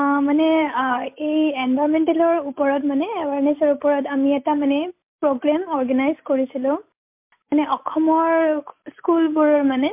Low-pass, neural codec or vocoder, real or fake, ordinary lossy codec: 3.6 kHz; none; real; MP3, 32 kbps